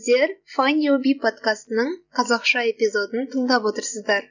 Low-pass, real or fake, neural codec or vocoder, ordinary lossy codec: 7.2 kHz; real; none; none